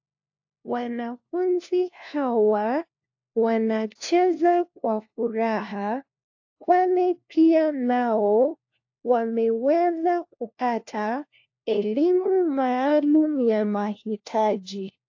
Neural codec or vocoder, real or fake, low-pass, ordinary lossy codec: codec, 16 kHz, 1 kbps, FunCodec, trained on LibriTTS, 50 frames a second; fake; 7.2 kHz; AAC, 48 kbps